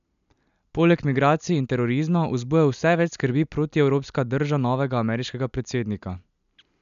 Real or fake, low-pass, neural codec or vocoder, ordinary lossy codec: real; 7.2 kHz; none; MP3, 96 kbps